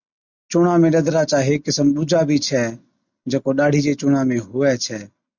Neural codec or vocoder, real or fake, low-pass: none; real; 7.2 kHz